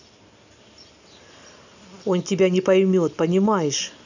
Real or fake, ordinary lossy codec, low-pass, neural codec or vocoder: real; none; 7.2 kHz; none